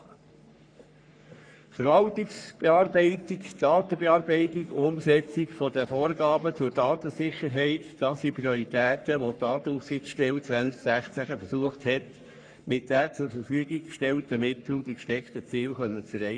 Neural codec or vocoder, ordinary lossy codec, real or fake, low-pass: codec, 44.1 kHz, 3.4 kbps, Pupu-Codec; none; fake; 9.9 kHz